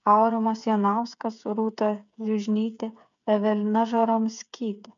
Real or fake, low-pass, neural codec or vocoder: fake; 7.2 kHz; codec, 16 kHz, 8 kbps, FreqCodec, smaller model